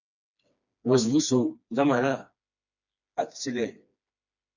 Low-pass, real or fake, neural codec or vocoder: 7.2 kHz; fake; codec, 16 kHz, 2 kbps, FreqCodec, smaller model